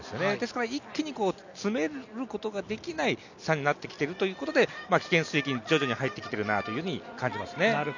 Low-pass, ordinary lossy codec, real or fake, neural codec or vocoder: 7.2 kHz; none; real; none